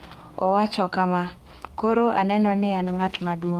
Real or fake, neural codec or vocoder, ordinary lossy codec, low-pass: fake; codec, 32 kHz, 1.9 kbps, SNAC; Opus, 32 kbps; 14.4 kHz